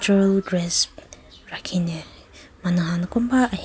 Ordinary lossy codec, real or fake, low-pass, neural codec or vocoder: none; real; none; none